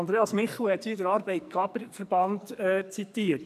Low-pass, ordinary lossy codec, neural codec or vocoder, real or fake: 14.4 kHz; none; codec, 44.1 kHz, 2.6 kbps, SNAC; fake